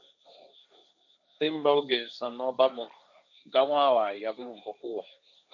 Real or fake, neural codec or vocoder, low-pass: fake; codec, 16 kHz, 1.1 kbps, Voila-Tokenizer; 7.2 kHz